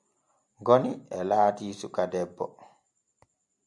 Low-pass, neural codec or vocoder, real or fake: 10.8 kHz; none; real